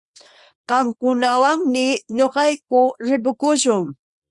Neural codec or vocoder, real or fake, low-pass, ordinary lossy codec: codec, 24 kHz, 0.9 kbps, WavTokenizer, small release; fake; 10.8 kHz; Opus, 64 kbps